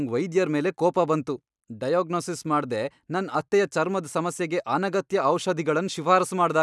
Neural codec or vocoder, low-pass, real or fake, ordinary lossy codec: none; none; real; none